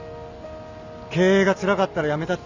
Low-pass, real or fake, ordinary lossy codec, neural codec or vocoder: 7.2 kHz; real; Opus, 64 kbps; none